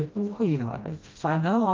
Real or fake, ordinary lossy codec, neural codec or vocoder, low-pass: fake; Opus, 24 kbps; codec, 16 kHz, 1 kbps, FreqCodec, smaller model; 7.2 kHz